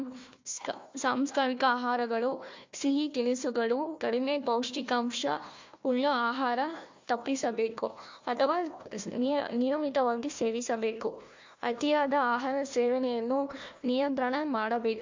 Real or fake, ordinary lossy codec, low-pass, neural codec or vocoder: fake; MP3, 48 kbps; 7.2 kHz; codec, 16 kHz, 1 kbps, FunCodec, trained on Chinese and English, 50 frames a second